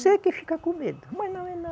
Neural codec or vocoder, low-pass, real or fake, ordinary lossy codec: none; none; real; none